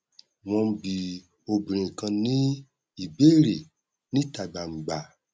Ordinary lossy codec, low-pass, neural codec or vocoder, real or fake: none; none; none; real